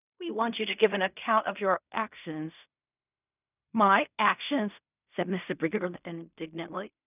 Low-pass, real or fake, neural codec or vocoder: 3.6 kHz; fake; codec, 16 kHz in and 24 kHz out, 0.4 kbps, LongCat-Audio-Codec, fine tuned four codebook decoder